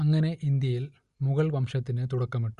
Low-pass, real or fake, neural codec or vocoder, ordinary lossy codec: 10.8 kHz; real; none; Opus, 64 kbps